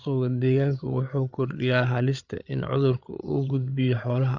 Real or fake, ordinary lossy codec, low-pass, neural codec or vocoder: fake; Opus, 64 kbps; 7.2 kHz; codec, 16 kHz, 8 kbps, FreqCodec, larger model